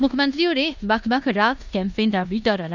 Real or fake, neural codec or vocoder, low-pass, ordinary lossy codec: fake; codec, 16 kHz in and 24 kHz out, 0.9 kbps, LongCat-Audio-Codec, four codebook decoder; 7.2 kHz; none